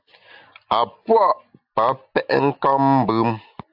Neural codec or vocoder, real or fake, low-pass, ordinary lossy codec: none; real; 5.4 kHz; AAC, 48 kbps